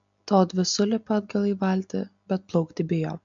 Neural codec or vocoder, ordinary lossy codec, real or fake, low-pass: none; MP3, 64 kbps; real; 7.2 kHz